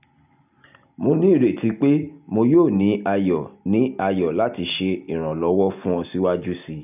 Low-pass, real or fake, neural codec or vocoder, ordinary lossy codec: 3.6 kHz; real; none; none